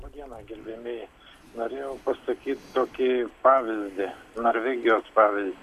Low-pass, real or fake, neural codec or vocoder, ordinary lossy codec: 14.4 kHz; real; none; MP3, 96 kbps